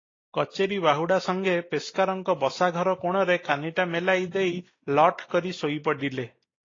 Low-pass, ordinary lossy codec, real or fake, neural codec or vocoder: 7.2 kHz; AAC, 32 kbps; real; none